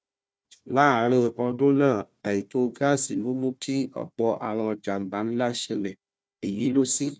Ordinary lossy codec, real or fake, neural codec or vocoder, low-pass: none; fake; codec, 16 kHz, 1 kbps, FunCodec, trained on Chinese and English, 50 frames a second; none